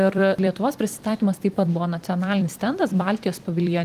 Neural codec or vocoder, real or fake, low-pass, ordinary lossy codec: vocoder, 44.1 kHz, 128 mel bands every 256 samples, BigVGAN v2; fake; 14.4 kHz; Opus, 24 kbps